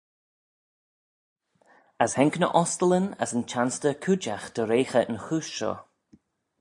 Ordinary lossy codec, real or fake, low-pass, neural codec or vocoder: AAC, 64 kbps; real; 10.8 kHz; none